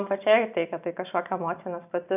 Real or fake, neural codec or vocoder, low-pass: real; none; 3.6 kHz